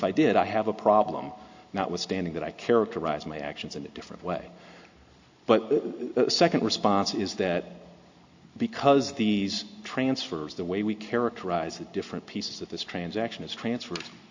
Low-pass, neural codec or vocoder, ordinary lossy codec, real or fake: 7.2 kHz; none; AAC, 48 kbps; real